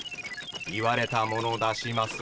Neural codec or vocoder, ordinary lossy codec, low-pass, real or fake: none; none; none; real